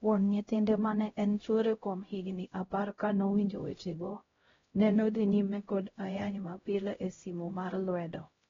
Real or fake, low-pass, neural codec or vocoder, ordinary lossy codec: fake; 7.2 kHz; codec, 16 kHz, 0.5 kbps, X-Codec, HuBERT features, trained on LibriSpeech; AAC, 24 kbps